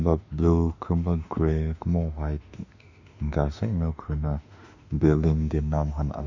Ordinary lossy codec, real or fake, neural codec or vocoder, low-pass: none; fake; autoencoder, 48 kHz, 32 numbers a frame, DAC-VAE, trained on Japanese speech; 7.2 kHz